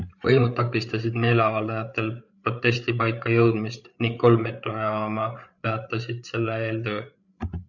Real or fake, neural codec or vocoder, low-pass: fake; codec, 16 kHz, 8 kbps, FreqCodec, larger model; 7.2 kHz